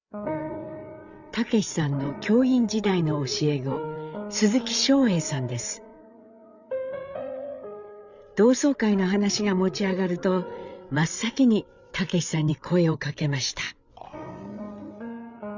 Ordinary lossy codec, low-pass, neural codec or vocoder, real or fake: none; 7.2 kHz; codec, 16 kHz, 8 kbps, FreqCodec, larger model; fake